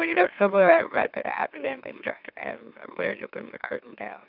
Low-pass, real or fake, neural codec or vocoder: 5.4 kHz; fake; autoencoder, 44.1 kHz, a latent of 192 numbers a frame, MeloTTS